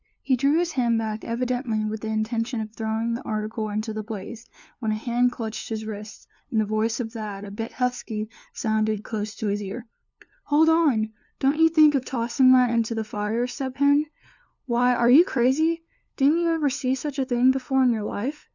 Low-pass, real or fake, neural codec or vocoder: 7.2 kHz; fake; codec, 16 kHz, 2 kbps, FunCodec, trained on LibriTTS, 25 frames a second